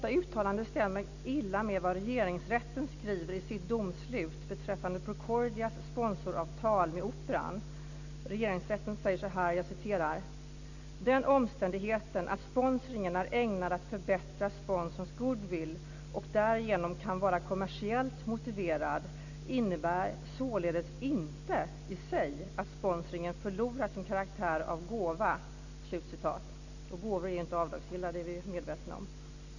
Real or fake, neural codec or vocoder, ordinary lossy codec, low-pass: real; none; none; 7.2 kHz